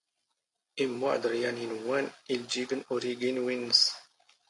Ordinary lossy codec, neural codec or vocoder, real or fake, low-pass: AAC, 48 kbps; none; real; 10.8 kHz